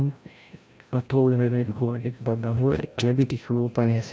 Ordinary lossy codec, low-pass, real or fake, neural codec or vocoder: none; none; fake; codec, 16 kHz, 0.5 kbps, FreqCodec, larger model